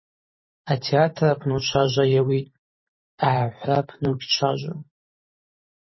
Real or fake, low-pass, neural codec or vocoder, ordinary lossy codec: fake; 7.2 kHz; vocoder, 44.1 kHz, 128 mel bands every 512 samples, BigVGAN v2; MP3, 24 kbps